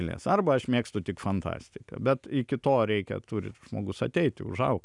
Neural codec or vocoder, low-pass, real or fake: none; 10.8 kHz; real